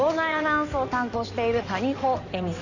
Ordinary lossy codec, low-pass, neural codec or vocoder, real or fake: none; 7.2 kHz; codec, 16 kHz in and 24 kHz out, 2.2 kbps, FireRedTTS-2 codec; fake